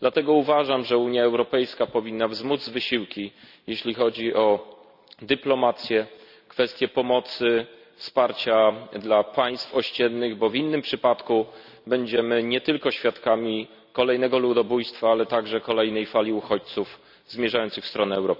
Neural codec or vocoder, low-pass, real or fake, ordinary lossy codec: none; 5.4 kHz; real; none